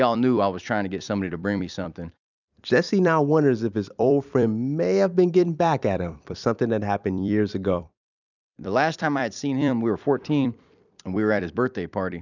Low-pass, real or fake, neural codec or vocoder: 7.2 kHz; fake; vocoder, 44.1 kHz, 128 mel bands every 256 samples, BigVGAN v2